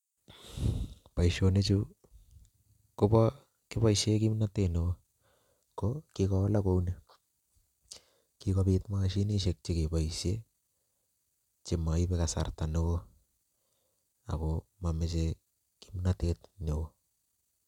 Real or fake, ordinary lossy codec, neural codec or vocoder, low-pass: real; none; none; 19.8 kHz